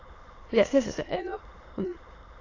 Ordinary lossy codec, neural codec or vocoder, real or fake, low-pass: AAC, 32 kbps; autoencoder, 22.05 kHz, a latent of 192 numbers a frame, VITS, trained on many speakers; fake; 7.2 kHz